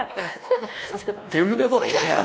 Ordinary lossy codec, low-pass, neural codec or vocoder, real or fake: none; none; codec, 16 kHz, 1 kbps, X-Codec, WavLM features, trained on Multilingual LibriSpeech; fake